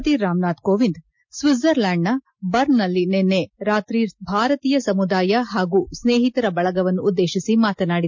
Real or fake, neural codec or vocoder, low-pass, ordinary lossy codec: real; none; 7.2 kHz; MP3, 48 kbps